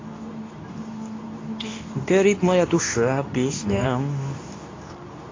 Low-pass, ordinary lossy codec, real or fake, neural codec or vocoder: 7.2 kHz; AAC, 32 kbps; fake; codec, 24 kHz, 0.9 kbps, WavTokenizer, medium speech release version 2